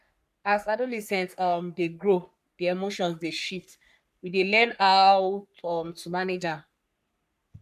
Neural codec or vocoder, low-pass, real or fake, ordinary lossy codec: codec, 44.1 kHz, 3.4 kbps, Pupu-Codec; 14.4 kHz; fake; none